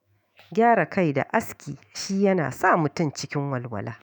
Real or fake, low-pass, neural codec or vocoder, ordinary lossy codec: fake; none; autoencoder, 48 kHz, 128 numbers a frame, DAC-VAE, trained on Japanese speech; none